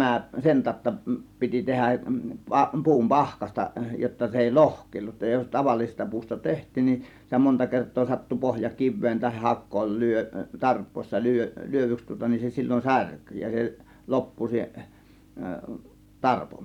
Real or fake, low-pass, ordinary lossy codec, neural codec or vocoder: fake; 19.8 kHz; none; vocoder, 44.1 kHz, 128 mel bands every 256 samples, BigVGAN v2